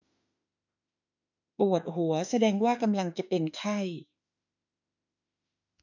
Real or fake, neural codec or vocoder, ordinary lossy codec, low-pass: fake; autoencoder, 48 kHz, 32 numbers a frame, DAC-VAE, trained on Japanese speech; none; 7.2 kHz